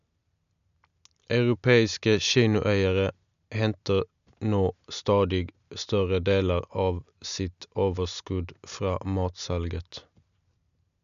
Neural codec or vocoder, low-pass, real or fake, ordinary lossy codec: none; 7.2 kHz; real; none